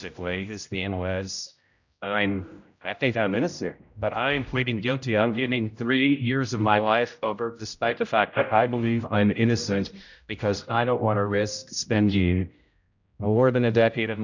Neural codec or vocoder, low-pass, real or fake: codec, 16 kHz, 0.5 kbps, X-Codec, HuBERT features, trained on general audio; 7.2 kHz; fake